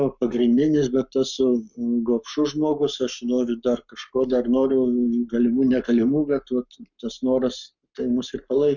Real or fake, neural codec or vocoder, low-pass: fake; codec, 44.1 kHz, 7.8 kbps, Pupu-Codec; 7.2 kHz